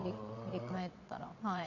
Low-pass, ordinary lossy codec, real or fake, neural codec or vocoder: 7.2 kHz; none; fake; vocoder, 22.05 kHz, 80 mel bands, WaveNeXt